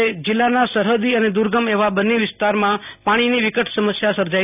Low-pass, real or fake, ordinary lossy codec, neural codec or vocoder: 3.6 kHz; real; none; none